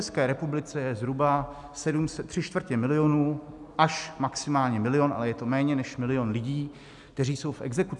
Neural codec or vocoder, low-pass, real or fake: none; 10.8 kHz; real